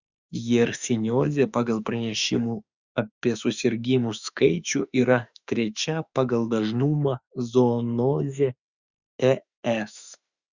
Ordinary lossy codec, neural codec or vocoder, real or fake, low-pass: Opus, 64 kbps; autoencoder, 48 kHz, 32 numbers a frame, DAC-VAE, trained on Japanese speech; fake; 7.2 kHz